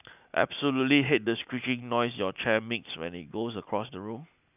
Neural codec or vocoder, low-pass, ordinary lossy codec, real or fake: none; 3.6 kHz; none; real